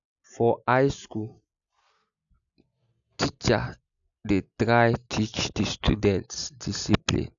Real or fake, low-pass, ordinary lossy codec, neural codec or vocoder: real; 7.2 kHz; none; none